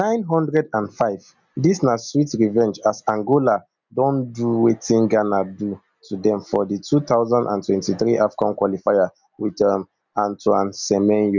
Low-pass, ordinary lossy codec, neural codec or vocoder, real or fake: 7.2 kHz; none; none; real